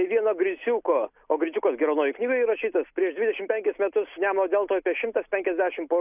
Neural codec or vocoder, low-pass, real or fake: none; 3.6 kHz; real